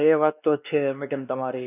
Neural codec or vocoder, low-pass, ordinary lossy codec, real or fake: codec, 16 kHz, 2 kbps, X-Codec, WavLM features, trained on Multilingual LibriSpeech; 3.6 kHz; none; fake